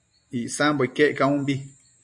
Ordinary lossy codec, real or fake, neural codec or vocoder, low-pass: MP3, 64 kbps; fake; vocoder, 44.1 kHz, 128 mel bands every 256 samples, BigVGAN v2; 10.8 kHz